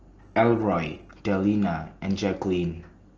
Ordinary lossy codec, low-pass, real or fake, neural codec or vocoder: Opus, 16 kbps; 7.2 kHz; real; none